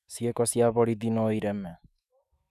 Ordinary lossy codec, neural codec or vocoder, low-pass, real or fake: none; autoencoder, 48 kHz, 128 numbers a frame, DAC-VAE, trained on Japanese speech; 14.4 kHz; fake